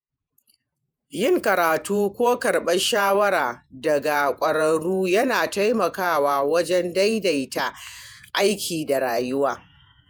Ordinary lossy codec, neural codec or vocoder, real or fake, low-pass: none; vocoder, 48 kHz, 128 mel bands, Vocos; fake; none